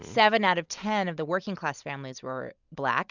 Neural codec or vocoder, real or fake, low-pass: none; real; 7.2 kHz